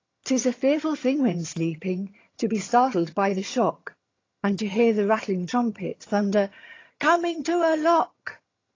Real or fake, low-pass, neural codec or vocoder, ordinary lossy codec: fake; 7.2 kHz; vocoder, 22.05 kHz, 80 mel bands, HiFi-GAN; AAC, 32 kbps